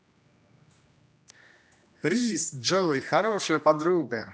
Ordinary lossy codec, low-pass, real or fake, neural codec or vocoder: none; none; fake; codec, 16 kHz, 1 kbps, X-Codec, HuBERT features, trained on general audio